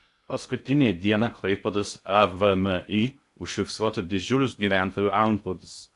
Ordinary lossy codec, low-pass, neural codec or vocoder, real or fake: AAC, 64 kbps; 10.8 kHz; codec, 16 kHz in and 24 kHz out, 0.6 kbps, FocalCodec, streaming, 2048 codes; fake